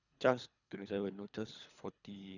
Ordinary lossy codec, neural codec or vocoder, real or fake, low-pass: none; codec, 24 kHz, 3 kbps, HILCodec; fake; 7.2 kHz